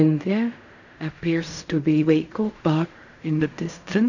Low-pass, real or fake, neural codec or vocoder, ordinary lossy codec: 7.2 kHz; fake; codec, 16 kHz in and 24 kHz out, 0.4 kbps, LongCat-Audio-Codec, fine tuned four codebook decoder; none